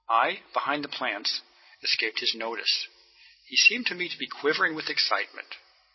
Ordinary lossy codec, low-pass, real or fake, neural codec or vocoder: MP3, 24 kbps; 7.2 kHz; real; none